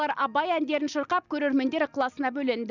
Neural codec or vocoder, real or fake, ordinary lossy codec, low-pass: none; real; none; 7.2 kHz